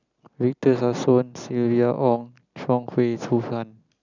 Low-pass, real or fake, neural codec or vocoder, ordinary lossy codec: 7.2 kHz; real; none; none